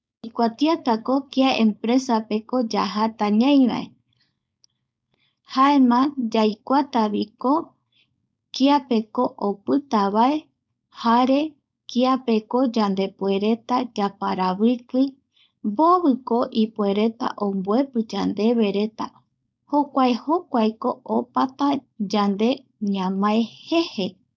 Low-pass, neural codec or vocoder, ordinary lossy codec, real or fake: none; codec, 16 kHz, 4.8 kbps, FACodec; none; fake